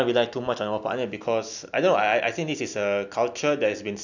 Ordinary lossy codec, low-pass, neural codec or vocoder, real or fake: none; 7.2 kHz; none; real